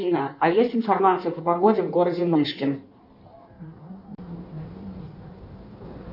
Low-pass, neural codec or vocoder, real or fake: 5.4 kHz; codec, 16 kHz in and 24 kHz out, 1.1 kbps, FireRedTTS-2 codec; fake